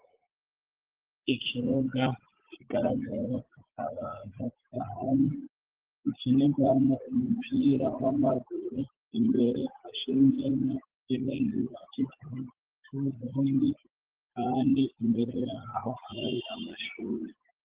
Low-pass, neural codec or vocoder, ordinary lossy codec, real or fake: 3.6 kHz; vocoder, 44.1 kHz, 80 mel bands, Vocos; Opus, 16 kbps; fake